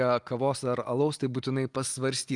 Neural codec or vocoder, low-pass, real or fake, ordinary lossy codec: none; 10.8 kHz; real; Opus, 32 kbps